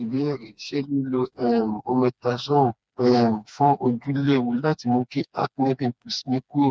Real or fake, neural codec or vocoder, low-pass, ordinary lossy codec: fake; codec, 16 kHz, 2 kbps, FreqCodec, smaller model; none; none